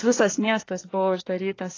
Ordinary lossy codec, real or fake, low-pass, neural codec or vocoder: AAC, 32 kbps; fake; 7.2 kHz; codec, 24 kHz, 1 kbps, SNAC